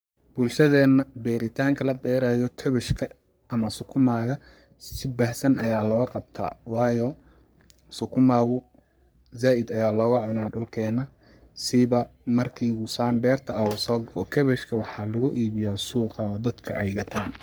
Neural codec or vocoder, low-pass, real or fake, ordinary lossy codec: codec, 44.1 kHz, 3.4 kbps, Pupu-Codec; none; fake; none